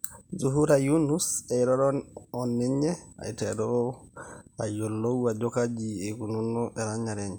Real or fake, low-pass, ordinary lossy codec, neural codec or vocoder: real; none; none; none